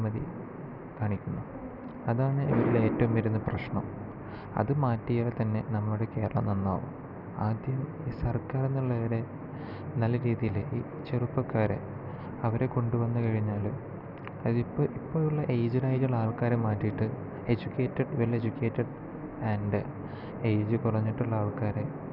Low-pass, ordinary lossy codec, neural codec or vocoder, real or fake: 5.4 kHz; none; none; real